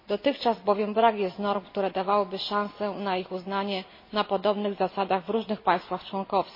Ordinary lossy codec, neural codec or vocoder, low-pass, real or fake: MP3, 24 kbps; none; 5.4 kHz; real